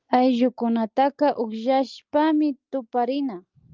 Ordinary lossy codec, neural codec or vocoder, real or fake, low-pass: Opus, 24 kbps; none; real; 7.2 kHz